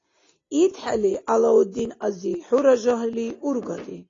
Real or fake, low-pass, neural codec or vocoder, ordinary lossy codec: real; 7.2 kHz; none; AAC, 32 kbps